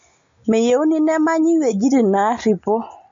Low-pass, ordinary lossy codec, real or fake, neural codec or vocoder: 7.2 kHz; MP3, 48 kbps; real; none